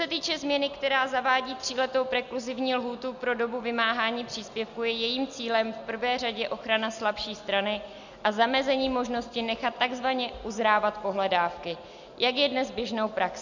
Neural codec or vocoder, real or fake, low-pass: none; real; 7.2 kHz